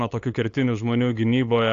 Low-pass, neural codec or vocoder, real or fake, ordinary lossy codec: 7.2 kHz; codec, 16 kHz, 8 kbps, FunCodec, trained on LibriTTS, 25 frames a second; fake; AAC, 48 kbps